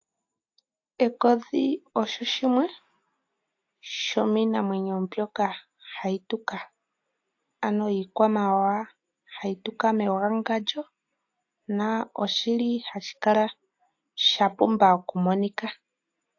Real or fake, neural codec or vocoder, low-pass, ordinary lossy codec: real; none; 7.2 kHz; AAC, 48 kbps